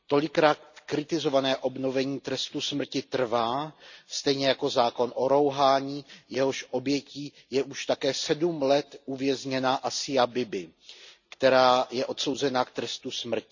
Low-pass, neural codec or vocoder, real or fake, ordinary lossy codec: 7.2 kHz; none; real; none